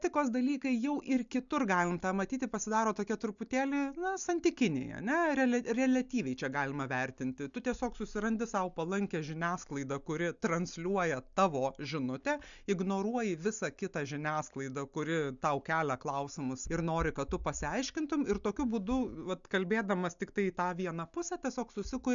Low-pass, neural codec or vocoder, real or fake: 7.2 kHz; none; real